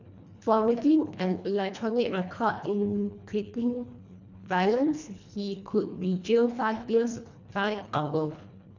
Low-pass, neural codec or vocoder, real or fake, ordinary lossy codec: 7.2 kHz; codec, 24 kHz, 1.5 kbps, HILCodec; fake; none